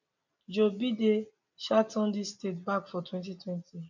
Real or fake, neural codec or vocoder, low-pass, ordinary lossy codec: real; none; 7.2 kHz; none